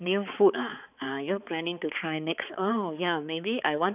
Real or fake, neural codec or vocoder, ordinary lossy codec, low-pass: fake; codec, 16 kHz, 4 kbps, X-Codec, HuBERT features, trained on balanced general audio; none; 3.6 kHz